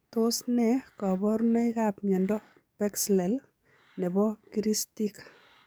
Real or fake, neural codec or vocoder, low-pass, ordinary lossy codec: fake; codec, 44.1 kHz, 7.8 kbps, DAC; none; none